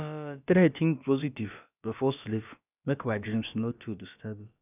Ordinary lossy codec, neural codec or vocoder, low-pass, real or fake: none; codec, 16 kHz, about 1 kbps, DyCAST, with the encoder's durations; 3.6 kHz; fake